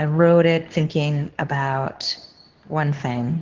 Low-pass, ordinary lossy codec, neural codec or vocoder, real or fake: 7.2 kHz; Opus, 16 kbps; codec, 24 kHz, 0.9 kbps, WavTokenizer, medium speech release version 2; fake